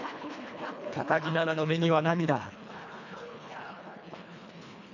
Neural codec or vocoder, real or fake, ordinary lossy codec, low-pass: codec, 24 kHz, 1.5 kbps, HILCodec; fake; none; 7.2 kHz